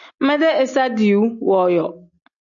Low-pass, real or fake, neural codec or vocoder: 7.2 kHz; real; none